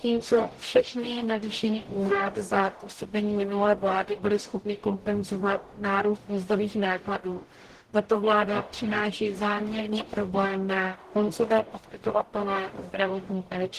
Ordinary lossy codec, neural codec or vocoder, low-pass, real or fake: Opus, 16 kbps; codec, 44.1 kHz, 0.9 kbps, DAC; 14.4 kHz; fake